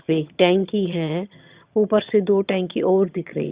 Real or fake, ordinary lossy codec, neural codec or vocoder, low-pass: fake; Opus, 64 kbps; vocoder, 22.05 kHz, 80 mel bands, HiFi-GAN; 3.6 kHz